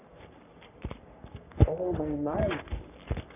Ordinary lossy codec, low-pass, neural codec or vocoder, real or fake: none; 3.6 kHz; codec, 44.1 kHz, 3.4 kbps, Pupu-Codec; fake